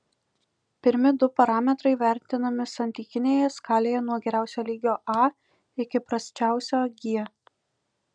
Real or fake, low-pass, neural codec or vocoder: real; 9.9 kHz; none